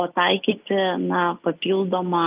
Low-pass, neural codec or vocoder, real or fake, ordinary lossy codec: 3.6 kHz; none; real; Opus, 24 kbps